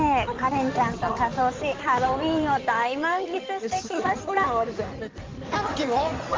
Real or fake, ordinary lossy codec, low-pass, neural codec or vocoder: fake; Opus, 32 kbps; 7.2 kHz; codec, 16 kHz in and 24 kHz out, 2.2 kbps, FireRedTTS-2 codec